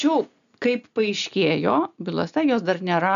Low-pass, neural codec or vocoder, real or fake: 7.2 kHz; none; real